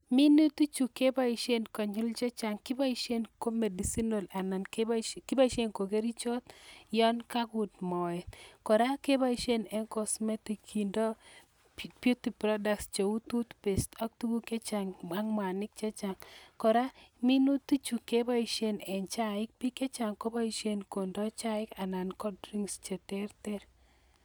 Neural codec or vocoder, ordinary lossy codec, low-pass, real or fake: none; none; none; real